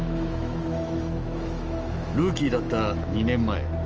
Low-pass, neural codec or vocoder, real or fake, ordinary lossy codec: 7.2 kHz; autoencoder, 48 kHz, 128 numbers a frame, DAC-VAE, trained on Japanese speech; fake; Opus, 24 kbps